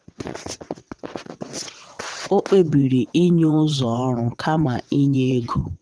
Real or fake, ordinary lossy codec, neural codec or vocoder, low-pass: fake; none; vocoder, 22.05 kHz, 80 mel bands, WaveNeXt; none